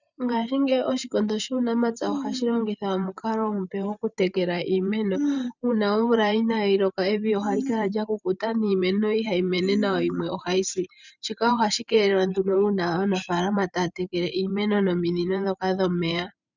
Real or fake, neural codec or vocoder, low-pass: fake; vocoder, 44.1 kHz, 128 mel bands every 512 samples, BigVGAN v2; 7.2 kHz